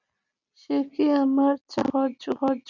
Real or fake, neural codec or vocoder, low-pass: real; none; 7.2 kHz